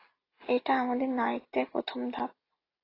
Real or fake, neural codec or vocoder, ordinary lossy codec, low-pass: real; none; AAC, 24 kbps; 5.4 kHz